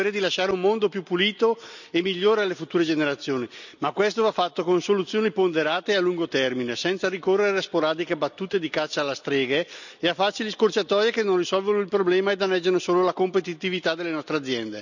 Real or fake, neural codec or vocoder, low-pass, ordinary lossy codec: real; none; 7.2 kHz; none